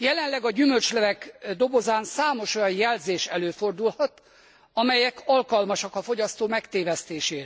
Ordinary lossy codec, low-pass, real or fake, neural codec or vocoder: none; none; real; none